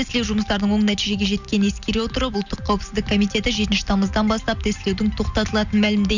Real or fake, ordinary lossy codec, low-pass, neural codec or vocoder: real; none; 7.2 kHz; none